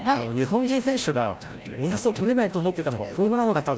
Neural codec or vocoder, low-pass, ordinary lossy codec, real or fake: codec, 16 kHz, 0.5 kbps, FreqCodec, larger model; none; none; fake